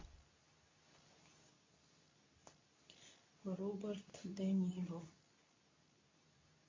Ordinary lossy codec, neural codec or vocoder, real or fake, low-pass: MP3, 32 kbps; codec, 24 kHz, 0.9 kbps, WavTokenizer, medium speech release version 2; fake; 7.2 kHz